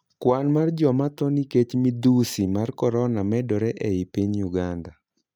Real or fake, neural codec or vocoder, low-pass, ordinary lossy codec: real; none; 19.8 kHz; none